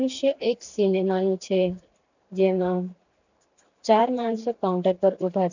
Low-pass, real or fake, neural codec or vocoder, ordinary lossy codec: 7.2 kHz; fake; vocoder, 44.1 kHz, 128 mel bands every 512 samples, BigVGAN v2; none